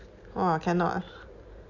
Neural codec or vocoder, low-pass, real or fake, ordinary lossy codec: none; 7.2 kHz; real; none